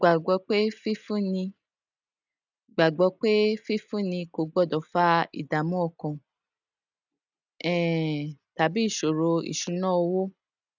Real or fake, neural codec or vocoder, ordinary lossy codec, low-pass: real; none; none; 7.2 kHz